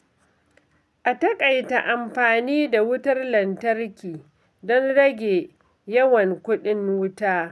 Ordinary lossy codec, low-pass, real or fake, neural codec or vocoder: none; none; real; none